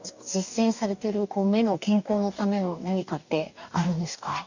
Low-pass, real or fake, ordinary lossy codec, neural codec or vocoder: 7.2 kHz; fake; none; codec, 44.1 kHz, 2.6 kbps, DAC